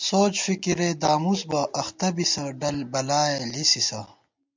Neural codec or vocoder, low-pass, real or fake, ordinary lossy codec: none; 7.2 kHz; real; MP3, 64 kbps